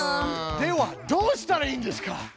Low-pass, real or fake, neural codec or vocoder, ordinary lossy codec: none; real; none; none